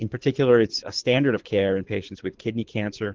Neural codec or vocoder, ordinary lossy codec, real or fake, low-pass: codec, 24 kHz, 6 kbps, HILCodec; Opus, 16 kbps; fake; 7.2 kHz